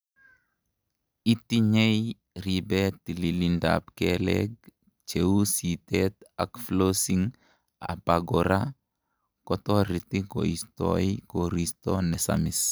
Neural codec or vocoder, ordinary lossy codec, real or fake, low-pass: none; none; real; none